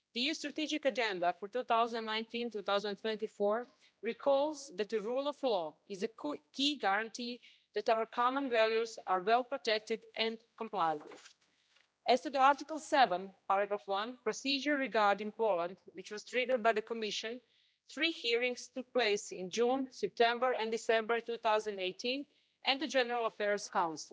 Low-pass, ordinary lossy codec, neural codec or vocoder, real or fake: none; none; codec, 16 kHz, 1 kbps, X-Codec, HuBERT features, trained on general audio; fake